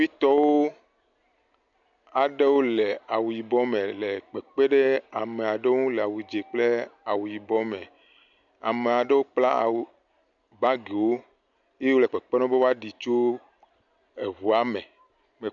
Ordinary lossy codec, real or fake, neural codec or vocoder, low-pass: MP3, 96 kbps; real; none; 7.2 kHz